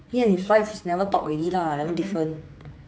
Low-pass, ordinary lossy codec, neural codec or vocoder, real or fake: none; none; codec, 16 kHz, 4 kbps, X-Codec, HuBERT features, trained on general audio; fake